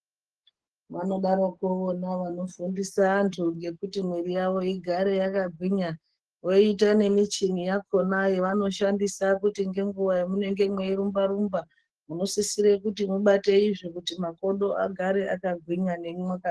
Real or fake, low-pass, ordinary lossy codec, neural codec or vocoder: fake; 10.8 kHz; Opus, 16 kbps; codec, 44.1 kHz, 7.8 kbps, DAC